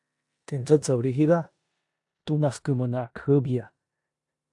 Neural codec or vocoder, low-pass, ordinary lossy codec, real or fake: codec, 16 kHz in and 24 kHz out, 0.9 kbps, LongCat-Audio-Codec, four codebook decoder; 10.8 kHz; AAC, 64 kbps; fake